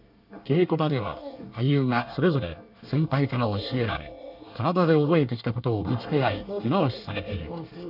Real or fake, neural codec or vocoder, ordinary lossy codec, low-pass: fake; codec, 24 kHz, 1 kbps, SNAC; none; 5.4 kHz